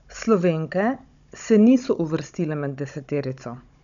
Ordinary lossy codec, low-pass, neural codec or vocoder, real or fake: none; 7.2 kHz; codec, 16 kHz, 16 kbps, FunCodec, trained on Chinese and English, 50 frames a second; fake